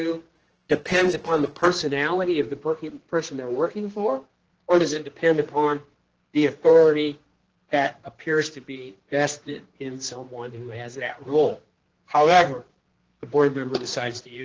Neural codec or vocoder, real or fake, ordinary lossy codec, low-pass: codec, 16 kHz, 2 kbps, X-Codec, HuBERT features, trained on general audio; fake; Opus, 16 kbps; 7.2 kHz